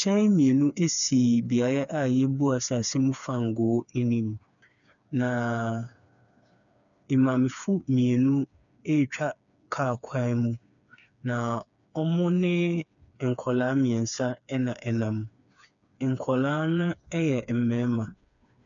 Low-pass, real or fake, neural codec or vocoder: 7.2 kHz; fake; codec, 16 kHz, 4 kbps, FreqCodec, smaller model